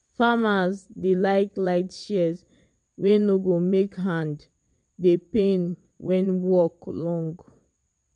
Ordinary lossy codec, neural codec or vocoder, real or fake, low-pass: MP3, 64 kbps; vocoder, 22.05 kHz, 80 mel bands, WaveNeXt; fake; 9.9 kHz